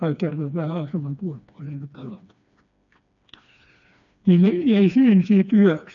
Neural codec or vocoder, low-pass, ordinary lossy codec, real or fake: codec, 16 kHz, 2 kbps, FreqCodec, smaller model; 7.2 kHz; none; fake